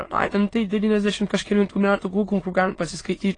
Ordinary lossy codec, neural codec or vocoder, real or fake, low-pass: AAC, 32 kbps; autoencoder, 22.05 kHz, a latent of 192 numbers a frame, VITS, trained on many speakers; fake; 9.9 kHz